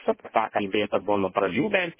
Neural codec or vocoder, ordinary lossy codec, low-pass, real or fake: codec, 16 kHz in and 24 kHz out, 0.6 kbps, FireRedTTS-2 codec; MP3, 16 kbps; 3.6 kHz; fake